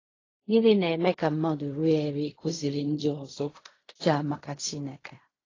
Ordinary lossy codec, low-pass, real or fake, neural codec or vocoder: AAC, 32 kbps; 7.2 kHz; fake; codec, 16 kHz in and 24 kHz out, 0.4 kbps, LongCat-Audio-Codec, fine tuned four codebook decoder